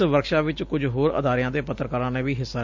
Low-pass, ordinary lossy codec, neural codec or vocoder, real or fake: 7.2 kHz; MP3, 64 kbps; none; real